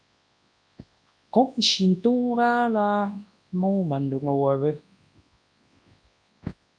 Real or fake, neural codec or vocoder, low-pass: fake; codec, 24 kHz, 0.9 kbps, WavTokenizer, large speech release; 9.9 kHz